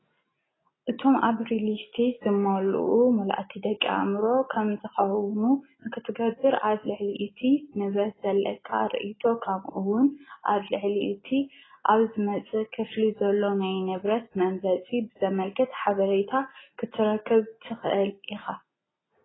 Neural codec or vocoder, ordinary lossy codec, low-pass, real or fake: none; AAC, 16 kbps; 7.2 kHz; real